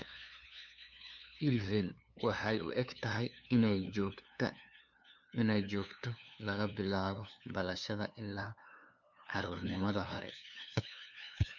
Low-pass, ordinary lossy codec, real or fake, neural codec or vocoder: 7.2 kHz; none; fake; codec, 16 kHz, 2 kbps, FunCodec, trained on LibriTTS, 25 frames a second